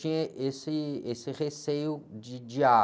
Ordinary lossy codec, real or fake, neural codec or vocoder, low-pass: none; real; none; none